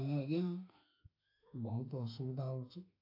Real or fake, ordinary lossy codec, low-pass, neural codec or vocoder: fake; AAC, 32 kbps; 5.4 kHz; autoencoder, 48 kHz, 32 numbers a frame, DAC-VAE, trained on Japanese speech